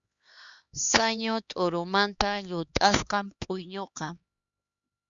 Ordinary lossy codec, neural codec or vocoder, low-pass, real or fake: Opus, 64 kbps; codec, 16 kHz, 2 kbps, X-Codec, HuBERT features, trained on LibriSpeech; 7.2 kHz; fake